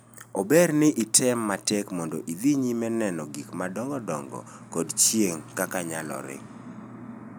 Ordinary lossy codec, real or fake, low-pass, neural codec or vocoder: none; real; none; none